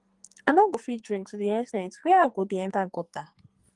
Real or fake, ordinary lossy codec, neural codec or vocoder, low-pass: fake; Opus, 24 kbps; codec, 44.1 kHz, 2.6 kbps, SNAC; 10.8 kHz